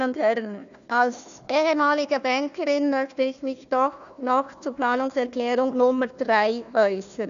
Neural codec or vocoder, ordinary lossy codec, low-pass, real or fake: codec, 16 kHz, 1 kbps, FunCodec, trained on Chinese and English, 50 frames a second; none; 7.2 kHz; fake